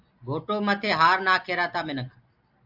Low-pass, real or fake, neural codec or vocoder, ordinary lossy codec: 5.4 kHz; real; none; AAC, 48 kbps